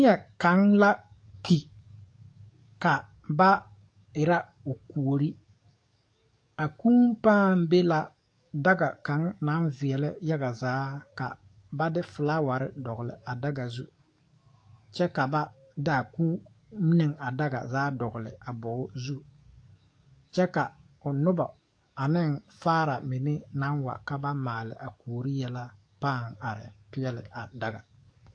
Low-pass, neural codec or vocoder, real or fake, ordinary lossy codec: 9.9 kHz; codec, 44.1 kHz, 7.8 kbps, DAC; fake; AAC, 48 kbps